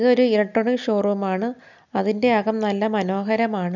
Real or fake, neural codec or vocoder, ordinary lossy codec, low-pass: real; none; none; 7.2 kHz